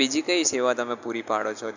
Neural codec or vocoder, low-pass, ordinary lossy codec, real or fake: none; 7.2 kHz; none; real